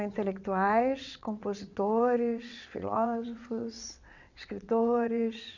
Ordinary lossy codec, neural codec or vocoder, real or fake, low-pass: none; vocoder, 22.05 kHz, 80 mel bands, WaveNeXt; fake; 7.2 kHz